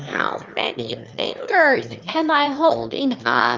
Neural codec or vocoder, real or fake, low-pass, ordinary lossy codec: autoencoder, 22.05 kHz, a latent of 192 numbers a frame, VITS, trained on one speaker; fake; 7.2 kHz; Opus, 32 kbps